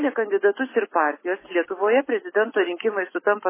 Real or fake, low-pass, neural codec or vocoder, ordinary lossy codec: real; 3.6 kHz; none; MP3, 16 kbps